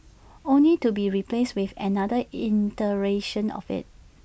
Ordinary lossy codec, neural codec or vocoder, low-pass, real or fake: none; none; none; real